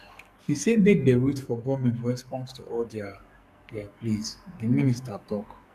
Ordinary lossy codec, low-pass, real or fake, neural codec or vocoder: none; 14.4 kHz; fake; codec, 44.1 kHz, 2.6 kbps, SNAC